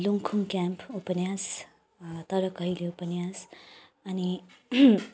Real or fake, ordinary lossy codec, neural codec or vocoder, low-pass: real; none; none; none